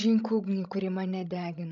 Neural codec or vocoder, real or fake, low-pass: codec, 16 kHz, 16 kbps, FreqCodec, larger model; fake; 7.2 kHz